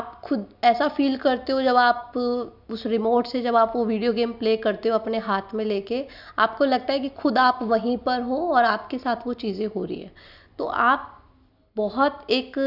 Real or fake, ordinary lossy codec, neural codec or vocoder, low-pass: real; none; none; 5.4 kHz